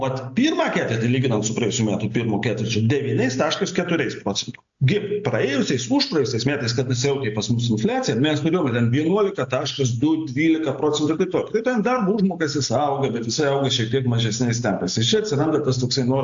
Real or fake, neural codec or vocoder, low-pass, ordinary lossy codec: real; none; 7.2 kHz; AAC, 64 kbps